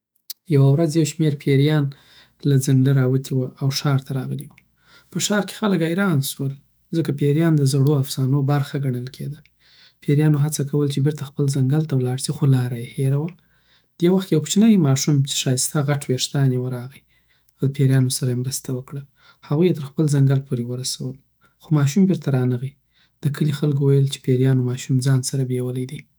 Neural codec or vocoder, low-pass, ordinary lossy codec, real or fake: autoencoder, 48 kHz, 128 numbers a frame, DAC-VAE, trained on Japanese speech; none; none; fake